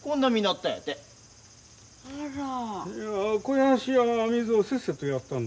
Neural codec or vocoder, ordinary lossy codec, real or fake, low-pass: none; none; real; none